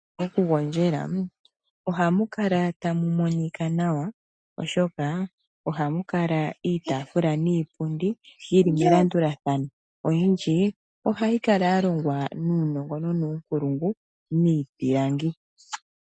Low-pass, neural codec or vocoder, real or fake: 9.9 kHz; none; real